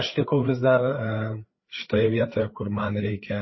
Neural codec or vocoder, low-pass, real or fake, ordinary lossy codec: codec, 16 kHz, 4 kbps, FreqCodec, larger model; 7.2 kHz; fake; MP3, 24 kbps